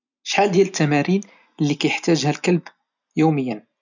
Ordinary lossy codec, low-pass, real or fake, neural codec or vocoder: none; 7.2 kHz; real; none